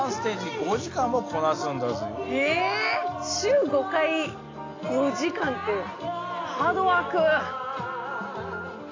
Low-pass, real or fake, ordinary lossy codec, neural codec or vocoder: 7.2 kHz; real; AAC, 32 kbps; none